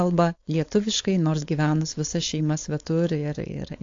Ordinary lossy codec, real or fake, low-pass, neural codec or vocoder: AAC, 48 kbps; fake; 7.2 kHz; codec, 16 kHz, 4.8 kbps, FACodec